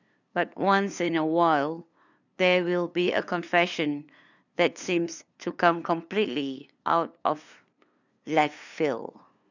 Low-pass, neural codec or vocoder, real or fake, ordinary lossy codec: 7.2 kHz; codec, 16 kHz, 2 kbps, FunCodec, trained on LibriTTS, 25 frames a second; fake; none